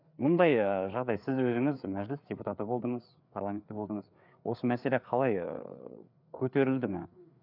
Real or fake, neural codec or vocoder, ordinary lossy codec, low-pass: fake; codec, 16 kHz, 4 kbps, FreqCodec, larger model; none; 5.4 kHz